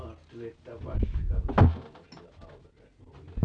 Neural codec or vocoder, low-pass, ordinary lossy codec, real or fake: none; 9.9 kHz; none; real